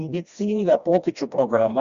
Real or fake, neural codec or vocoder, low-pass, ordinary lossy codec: fake; codec, 16 kHz, 1 kbps, FreqCodec, smaller model; 7.2 kHz; Opus, 64 kbps